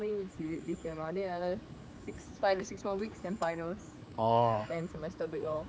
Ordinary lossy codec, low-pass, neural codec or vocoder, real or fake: none; none; codec, 16 kHz, 4 kbps, X-Codec, HuBERT features, trained on general audio; fake